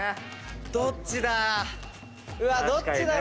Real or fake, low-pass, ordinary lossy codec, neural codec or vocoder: real; none; none; none